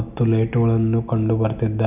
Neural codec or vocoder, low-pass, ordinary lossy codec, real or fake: none; 3.6 kHz; none; real